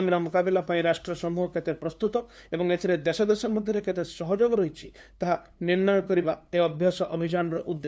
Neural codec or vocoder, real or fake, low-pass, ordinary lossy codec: codec, 16 kHz, 2 kbps, FunCodec, trained on LibriTTS, 25 frames a second; fake; none; none